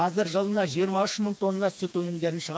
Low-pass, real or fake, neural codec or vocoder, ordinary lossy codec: none; fake; codec, 16 kHz, 2 kbps, FreqCodec, smaller model; none